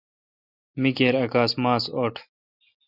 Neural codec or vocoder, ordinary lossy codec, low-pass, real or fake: none; Opus, 64 kbps; 5.4 kHz; real